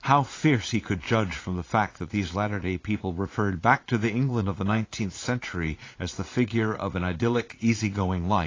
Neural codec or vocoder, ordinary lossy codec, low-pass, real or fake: vocoder, 44.1 kHz, 80 mel bands, Vocos; AAC, 32 kbps; 7.2 kHz; fake